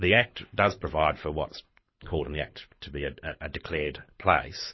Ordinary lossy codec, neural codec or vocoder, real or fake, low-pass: MP3, 24 kbps; codec, 24 kHz, 6 kbps, HILCodec; fake; 7.2 kHz